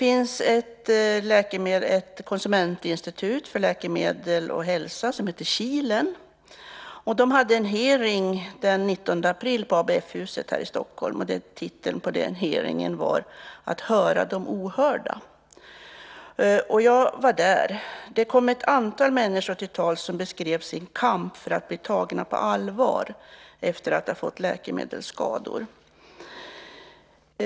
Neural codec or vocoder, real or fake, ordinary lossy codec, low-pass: none; real; none; none